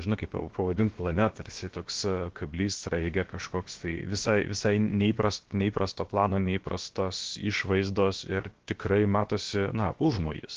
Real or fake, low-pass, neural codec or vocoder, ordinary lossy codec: fake; 7.2 kHz; codec, 16 kHz, about 1 kbps, DyCAST, with the encoder's durations; Opus, 16 kbps